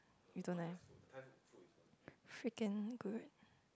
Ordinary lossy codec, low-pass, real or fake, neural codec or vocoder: none; none; real; none